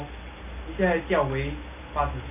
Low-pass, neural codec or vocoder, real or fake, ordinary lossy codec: 3.6 kHz; none; real; none